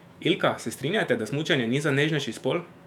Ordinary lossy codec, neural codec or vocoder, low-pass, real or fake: none; autoencoder, 48 kHz, 128 numbers a frame, DAC-VAE, trained on Japanese speech; 19.8 kHz; fake